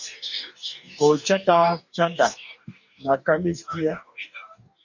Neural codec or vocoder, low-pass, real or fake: codec, 44.1 kHz, 2.6 kbps, DAC; 7.2 kHz; fake